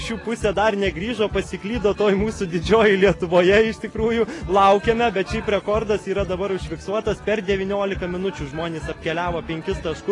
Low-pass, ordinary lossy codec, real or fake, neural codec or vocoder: 10.8 kHz; AAC, 32 kbps; real; none